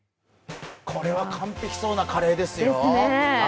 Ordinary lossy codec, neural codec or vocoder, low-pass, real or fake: none; none; none; real